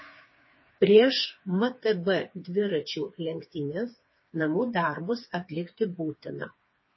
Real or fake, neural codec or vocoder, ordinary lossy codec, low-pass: fake; codec, 16 kHz, 4 kbps, FreqCodec, smaller model; MP3, 24 kbps; 7.2 kHz